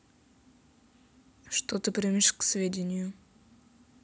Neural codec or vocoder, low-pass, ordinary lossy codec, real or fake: none; none; none; real